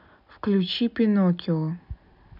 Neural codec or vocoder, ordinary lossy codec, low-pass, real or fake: none; none; 5.4 kHz; real